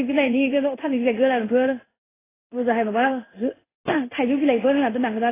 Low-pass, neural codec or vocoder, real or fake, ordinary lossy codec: 3.6 kHz; codec, 16 kHz in and 24 kHz out, 1 kbps, XY-Tokenizer; fake; AAC, 16 kbps